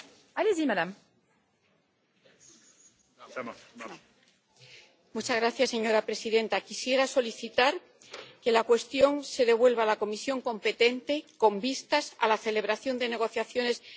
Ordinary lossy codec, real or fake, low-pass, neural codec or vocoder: none; real; none; none